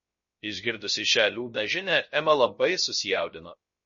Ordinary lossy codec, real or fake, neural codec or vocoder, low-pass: MP3, 32 kbps; fake; codec, 16 kHz, 0.3 kbps, FocalCodec; 7.2 kHz